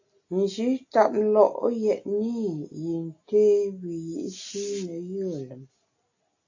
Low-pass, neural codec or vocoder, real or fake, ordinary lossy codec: 7.2 kHz; none; real; AAC, 48 kbps